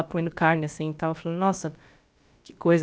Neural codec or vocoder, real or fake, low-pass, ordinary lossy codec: codec, 16 kHz, about 1 kbps, DyCAST, with the encoder's durations; fake; none; none